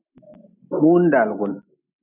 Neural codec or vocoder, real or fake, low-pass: none; real; 3.6 kHz